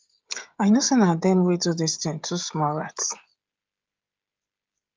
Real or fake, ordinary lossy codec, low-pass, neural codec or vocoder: fake; Opus, 32 kbps; 7.2 kHz; codec, 16 kHz, 16 kbps, FreqCodec, smaller model